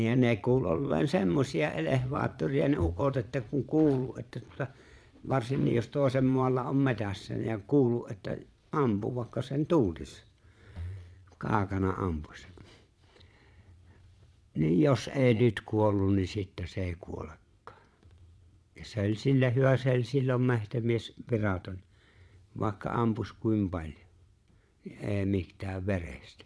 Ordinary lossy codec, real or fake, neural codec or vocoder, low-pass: none; fake; vocoder, 22.05 kHz, 80 mel bands, Vocos; none